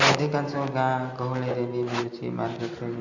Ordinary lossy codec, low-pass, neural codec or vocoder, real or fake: none; 7.2 kHz; none; real